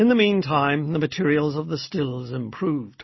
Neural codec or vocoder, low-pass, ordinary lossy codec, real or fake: none; 7.2 kHz; MP3, 24 kbps; real